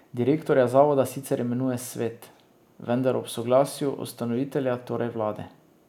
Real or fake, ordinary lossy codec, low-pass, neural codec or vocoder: real; none; 19.8 kHz; none